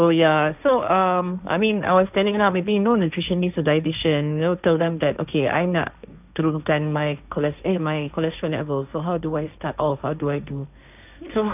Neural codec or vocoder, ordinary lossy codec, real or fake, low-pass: codec, 16 kHz, 1.1 kbps, Voila-Tokenizer; none; fake; 3.6 kHz